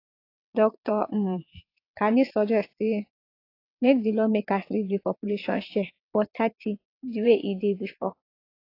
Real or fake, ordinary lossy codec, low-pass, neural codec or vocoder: fake; AAC, 32 kbps; 5.4 kHz; vocoder, 22.05 kHz, 80 mel bands, WaveNeXt